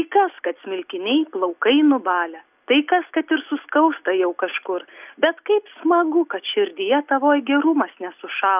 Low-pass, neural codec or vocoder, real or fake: 3.6 kHz; none; real